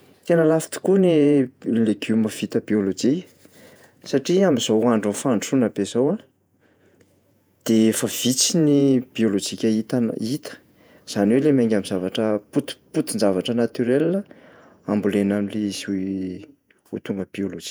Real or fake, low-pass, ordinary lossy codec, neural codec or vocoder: fake; none; none; vocoder, 48 kHz, 128 mel bands, Vocos